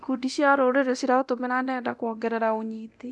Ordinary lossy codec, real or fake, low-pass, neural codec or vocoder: none; fake; none; codec, 24 kHz, 0.9 kbps, DualCodec